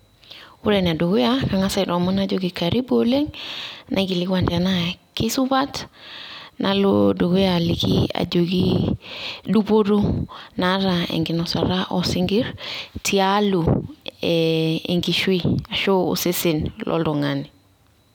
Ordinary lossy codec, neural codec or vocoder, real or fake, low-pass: none; none; real; 19.8 kHz